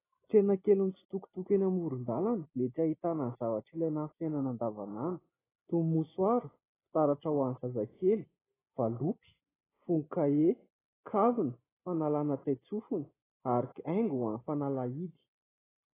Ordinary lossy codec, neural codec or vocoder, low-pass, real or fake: AAC, 16 kbps; none; 3.6 kHz; real